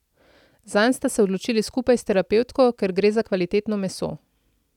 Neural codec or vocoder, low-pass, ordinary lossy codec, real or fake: none; 19.8 kHz; none; real